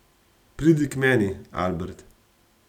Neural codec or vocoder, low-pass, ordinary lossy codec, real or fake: none; 19.8 kHz; none; real